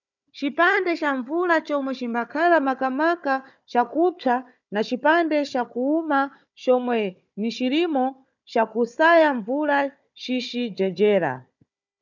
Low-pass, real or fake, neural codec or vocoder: 7.2 kHz; fake; codec, 16 kHz, 4 kbps, FunCodec, trained on Chinese and English, 50 frames a second